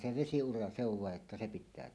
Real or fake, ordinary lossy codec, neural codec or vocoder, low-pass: real; none; none; none